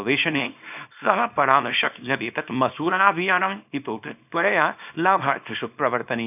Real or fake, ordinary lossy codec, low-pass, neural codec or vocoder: fake; none; 3.6 kHz; codec, 24 kHz, 0.9 kbps, WavTokenizer, small release